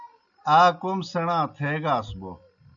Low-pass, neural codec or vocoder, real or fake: 7.2 kHz; none; real